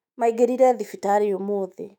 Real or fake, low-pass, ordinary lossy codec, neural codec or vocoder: fake; 19.8 kHz; none; autoencoder, 48 kHz, 128 numbers a frame, DAC-VAE, trained on Japanese speech